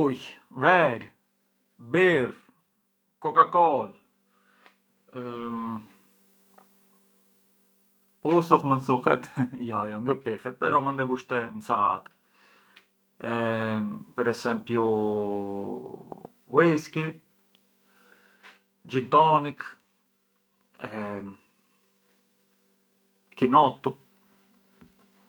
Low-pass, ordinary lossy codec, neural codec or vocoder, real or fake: none; none; codec, 44.1 kHz, 2.6 kbps, SNAC; fake